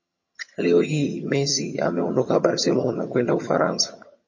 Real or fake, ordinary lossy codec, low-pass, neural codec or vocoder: fake; MP3, 32 kbps; 7.2 kHz; vocoder, 22.05 kHz, 80 mel bands, HiFi-GAN